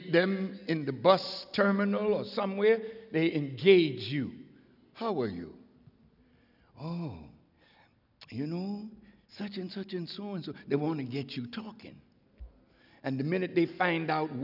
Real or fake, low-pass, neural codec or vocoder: real; 5.4 kHz; none